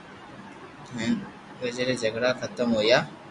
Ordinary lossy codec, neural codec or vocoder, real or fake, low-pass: MP3, 96 kbps; none; real; 10.8 kHz